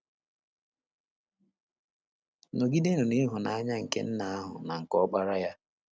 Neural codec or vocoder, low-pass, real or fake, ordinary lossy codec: none; none; real; none